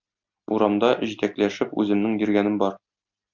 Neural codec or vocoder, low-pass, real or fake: none; 7.2 kHz; real